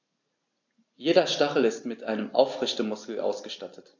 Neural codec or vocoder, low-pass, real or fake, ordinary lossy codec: none; 7.2 kHz; real; AAC, 48 kbps